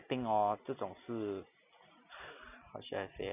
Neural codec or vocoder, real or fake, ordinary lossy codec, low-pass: none; real; none; 3.6 kHz